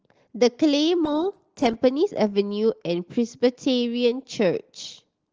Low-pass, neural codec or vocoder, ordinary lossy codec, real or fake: 7.2 kHz; none; Opus, 16 kbps; real